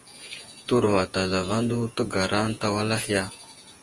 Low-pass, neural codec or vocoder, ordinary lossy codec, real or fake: 10.8 kHz; vocoder, 48 kHz, 128 mel bands, Vocos; Opus, 24 kbps; fake